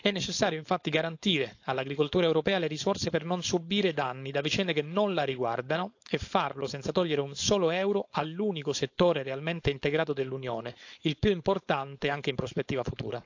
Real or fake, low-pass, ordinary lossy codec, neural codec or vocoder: fake; 7.2 kHz; AAC, 48 kbps; codec, 16 kHz, 4.8 kbps, FACodec